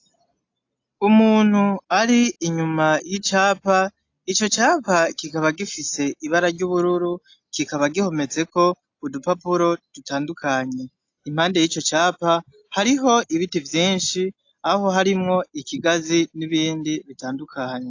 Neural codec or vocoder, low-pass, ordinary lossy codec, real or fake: none; 7.2 kHz; AAC, 48 kbps; real